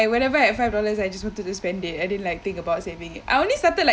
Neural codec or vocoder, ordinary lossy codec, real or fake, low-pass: none; none; real; none